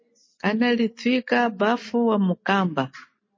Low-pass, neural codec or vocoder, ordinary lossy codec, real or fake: 7.2 kHz; none; MP3, 32 kbps; real